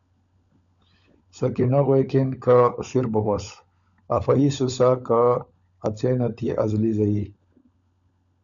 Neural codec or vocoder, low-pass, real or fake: codec, 16 kHz, 16 kbps, FunCodec, trained on LibriTTS, 50 frames a second; 7.2 kHz; fake